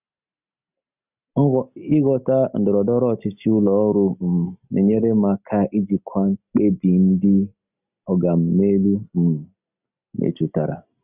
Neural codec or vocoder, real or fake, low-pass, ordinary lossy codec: none; real; 3.6 kHz; none